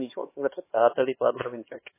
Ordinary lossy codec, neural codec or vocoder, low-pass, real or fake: MP3, 16 kbps; codec, 16 kHz, 4 kbps, X-Codec, HuBERT features, trained on LibriSpeech; 3.6 kHz; fake